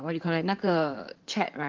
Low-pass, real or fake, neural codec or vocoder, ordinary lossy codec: 7.2 kHz; fake; codec, 16 kHz in and 24 kHz out, 2.2 kbps, FireRedTTS-2 codec; Opus, 32 kbps